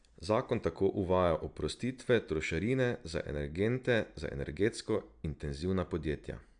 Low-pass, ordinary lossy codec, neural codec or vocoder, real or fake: 9.9 kHz; none; none; real